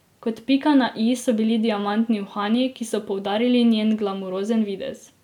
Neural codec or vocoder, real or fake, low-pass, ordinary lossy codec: none; real; 19.8 kHz; none